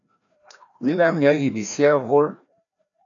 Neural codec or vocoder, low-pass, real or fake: codec, 16 kHz, 1 kbps, FreqCodec, larger model; 7.2 kHz; fake